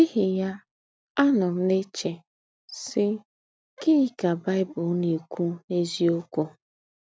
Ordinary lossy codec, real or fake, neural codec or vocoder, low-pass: none; real; none; none